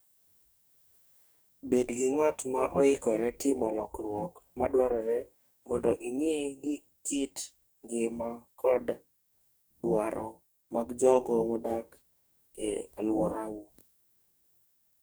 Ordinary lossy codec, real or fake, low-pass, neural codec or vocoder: none; fake; none; codec, 44.1 kHz, 2.6 kbps, DAC